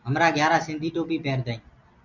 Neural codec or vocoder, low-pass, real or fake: none; 7.2 kHz; real